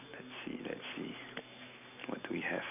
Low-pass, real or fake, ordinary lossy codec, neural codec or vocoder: 3.6 kHz; real; none; none